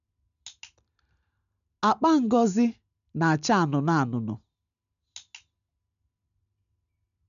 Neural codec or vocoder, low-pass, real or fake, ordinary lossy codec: none; 7.2 kHz; real; none